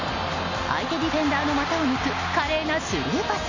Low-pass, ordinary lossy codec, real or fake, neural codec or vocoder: 7.2 kHz; none; real; none